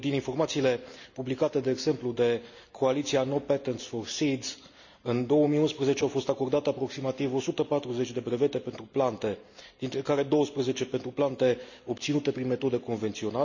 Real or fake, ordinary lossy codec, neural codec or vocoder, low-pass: real; none; none; 7.2 kHz